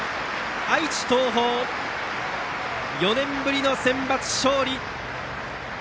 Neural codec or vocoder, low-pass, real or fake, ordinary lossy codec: none; none; real; none